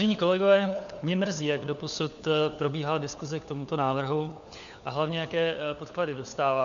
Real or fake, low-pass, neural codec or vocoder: fake; 7.2 kHz; codec, 16 kHz, 4 kbps, FunCodec, trained on LibriTTS, 50 frames a second